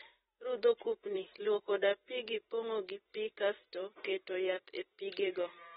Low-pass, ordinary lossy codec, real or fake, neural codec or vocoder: 19.8 kHz; AAC, 16 kbps; fake; vocoder, 44.1 kHz, 128 mel bands every 256 samples, BigVGAN v2